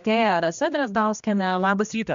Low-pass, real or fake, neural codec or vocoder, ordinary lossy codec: 7.2 kHz; fake; codec, 16 kHz, 1 kbps, X-Codec, HuBERT features, trained on general audio; MP3, 64 kbps